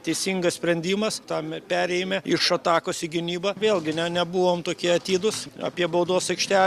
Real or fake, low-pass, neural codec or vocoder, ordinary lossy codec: real; 14.4 kHz; none; Opus, 64 kbps